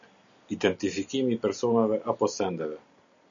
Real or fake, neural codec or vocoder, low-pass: real; none; 7.2 kHz